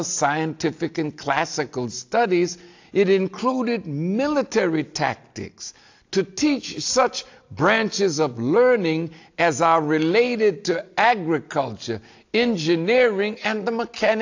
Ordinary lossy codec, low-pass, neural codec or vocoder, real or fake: AAC, 48 kbps; 7.2 kHz; none; real